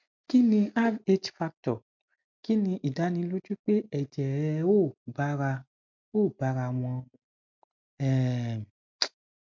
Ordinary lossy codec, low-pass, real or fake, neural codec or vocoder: MP3, 64 kbps; 7.2 kHz; real; none